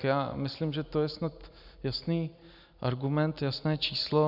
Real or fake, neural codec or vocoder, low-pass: real; none; 5.4 kHz